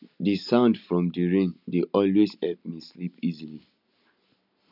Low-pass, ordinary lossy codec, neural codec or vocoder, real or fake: 5.4 kHz; none; none; real